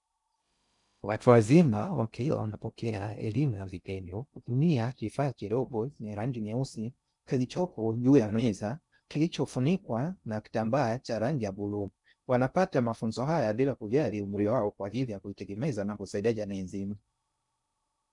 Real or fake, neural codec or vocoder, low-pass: fake; codec, 16 kHz in and 24 kHz out, 0.6 kbps, FocalCodec, streaming, 2048 codes; 10.8 kHz